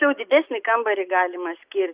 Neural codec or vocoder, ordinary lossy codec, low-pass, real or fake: none; Opus, 64 kbps; 3.6 kHz; real